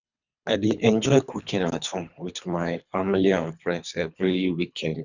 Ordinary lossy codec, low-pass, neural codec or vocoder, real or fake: none; 7.2 kHz; codec, 24 kHz, 3 kbps, HILCodec; fake